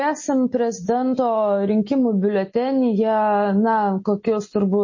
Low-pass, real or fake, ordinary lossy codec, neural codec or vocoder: 7.2 kHz; real; MP3, 32 kbps; none